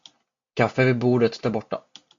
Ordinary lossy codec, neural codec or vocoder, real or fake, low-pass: MP3, 64 kbps; none; real; 7.2 kHz